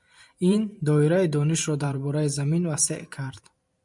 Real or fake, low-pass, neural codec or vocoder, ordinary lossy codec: fake; 10.8 kHz; vocoder, 44.1 kHz, 128 mel bands every 512 samples, BigVGAN v2; MP3, 96 kbps